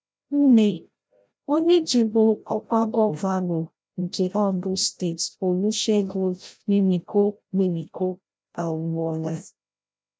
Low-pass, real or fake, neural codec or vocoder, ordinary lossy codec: none; fake; codec, 16 kHz, 0.5 kbps, FreqCodec, larger model; none